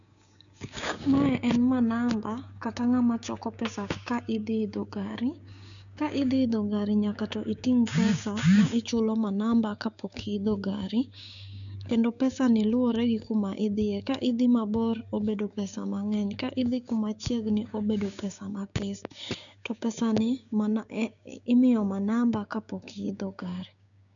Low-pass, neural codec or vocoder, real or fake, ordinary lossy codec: 7.2 kHz; codec, 16 kHz, 6 kbps, DAC; fake; none